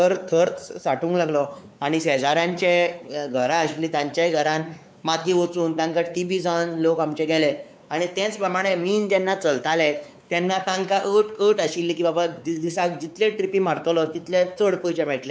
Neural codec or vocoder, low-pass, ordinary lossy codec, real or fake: codec, 16 kHz, 4 kbps, X-Codec, WavLM features, trained on Multilingual LibriSpeech; none; none; fake